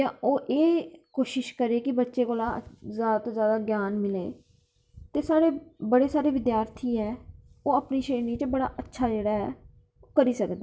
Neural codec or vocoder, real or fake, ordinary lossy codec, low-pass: none; real; none; none